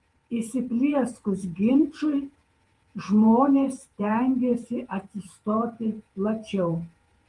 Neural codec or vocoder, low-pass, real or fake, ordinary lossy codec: vocoder, 48 kHz, 128 mel bands, Vocos; 10.8 kHz; fake; Opus, 24 kbps